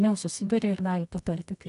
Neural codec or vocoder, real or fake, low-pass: codec, 24 kHz, 0.9 kbps, WavTokenizer, medium music audio release; fake; 10.8 kHz